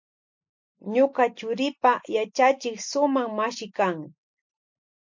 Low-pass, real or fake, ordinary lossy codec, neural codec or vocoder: 7.2 kHz; real; MP3, 64 kbps; none